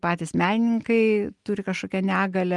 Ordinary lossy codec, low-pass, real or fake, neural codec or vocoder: Opus, 32 kbps; 10.8 kHz; real; none